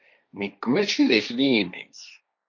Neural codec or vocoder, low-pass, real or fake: codec, 16 kHz, 1.1 kbps, Voila-Tokenizer; 7.2 kHz; fake